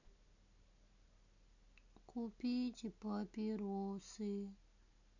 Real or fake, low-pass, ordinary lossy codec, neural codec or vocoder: real; 7.2 kHz; none; none